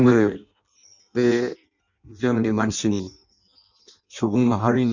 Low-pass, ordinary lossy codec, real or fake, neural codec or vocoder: 7.2 kHz; none; fake; codec, 16 kHz in and 24 kHz out, 0.6 kbps, FireRedTTS-2 codec